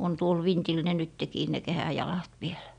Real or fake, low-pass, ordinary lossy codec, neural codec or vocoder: real; 9.9 kHz; none; none